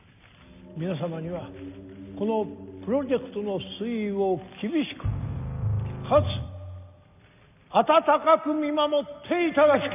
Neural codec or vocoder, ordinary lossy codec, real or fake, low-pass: none; none; real; 3.6 kHz